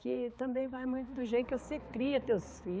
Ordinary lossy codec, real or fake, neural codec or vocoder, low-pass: none; fake; codec, 16 kHz, 4 kbps, X-Codec, HuBERT features, trained on balanced general audio; none